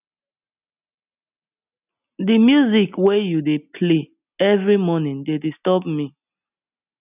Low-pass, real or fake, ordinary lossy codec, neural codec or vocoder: 3.6 kHz; real; none; none